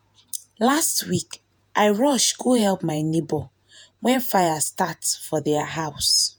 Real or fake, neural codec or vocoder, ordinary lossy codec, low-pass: fake; vocoder, 48 kHz, 128 mel bands, Vocos; none; none